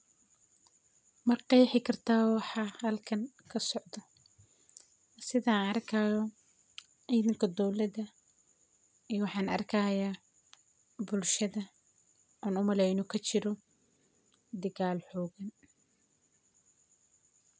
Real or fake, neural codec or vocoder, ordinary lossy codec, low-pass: real; none; none; none